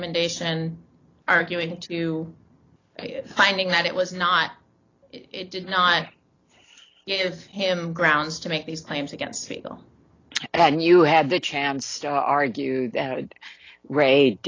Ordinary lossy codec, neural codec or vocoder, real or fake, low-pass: AAC, 32 kbps; none; real; 7.2 kHz